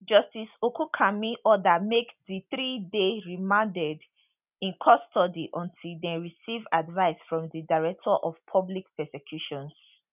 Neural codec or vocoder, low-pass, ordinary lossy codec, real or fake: none; 3.6 kHz; none; real